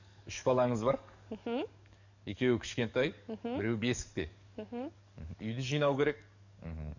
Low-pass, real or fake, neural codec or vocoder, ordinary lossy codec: 7.2 kHz; fake; codec, 44.1 kHz, 7.8 kbps, DAC; none